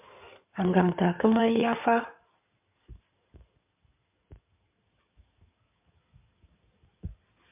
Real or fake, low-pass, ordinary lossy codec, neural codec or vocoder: fake; 3.6 kHz; MP3, 32 kbps; codec, 16 kHz in and 24 kHz out, 2.2 kbps, FireRedTTS-2 codec